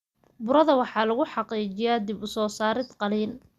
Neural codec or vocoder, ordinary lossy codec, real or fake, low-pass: none; Opus, 64 kbps; real; 14.4 kHz